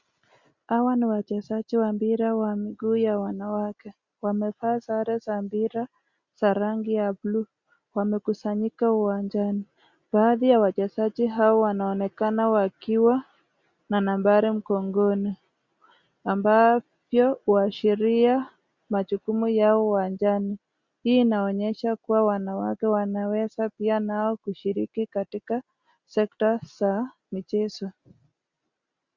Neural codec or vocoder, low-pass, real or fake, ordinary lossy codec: none; 7.2 kHz; real; Opus, 64 kbps